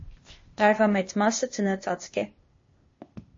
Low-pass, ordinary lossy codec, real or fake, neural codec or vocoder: 7.2 kHz; MP3, 32 kbps; fake; codec, 16 kHz, 0.8 kbps, ZipCodec